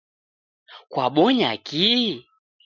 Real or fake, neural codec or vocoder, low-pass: real; none; 7.2 kHz